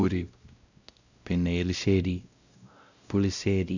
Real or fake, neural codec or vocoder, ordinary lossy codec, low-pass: fake; codec, 16 kHz, 0.5 kbps, X-Codec, WavLM features, trained on Multilingual LibriSpeech; none; 7.2 kHz